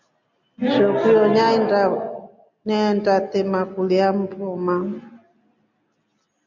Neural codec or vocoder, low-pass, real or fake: none; 7.2 kHz; real